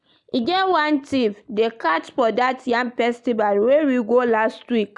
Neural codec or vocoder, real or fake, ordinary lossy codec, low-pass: none; real; none; 10.8 kHz